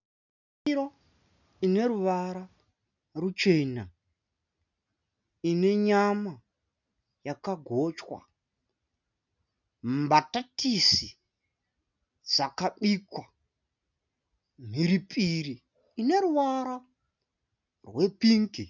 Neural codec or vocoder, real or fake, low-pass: none; real; 7.2 kHz